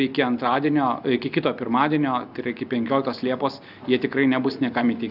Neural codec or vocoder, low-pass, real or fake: none; 5.4 kHz; real